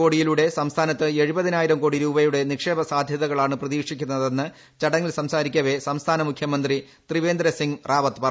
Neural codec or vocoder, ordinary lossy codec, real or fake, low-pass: none; none; real; none